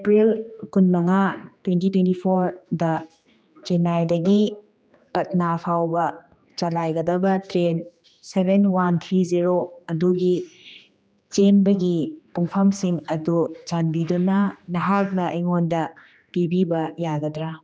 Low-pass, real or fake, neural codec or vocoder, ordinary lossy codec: none; fake; codec, 16 kHz, 2 kbps, X-Codec, HuBERT features, trained on general audio; none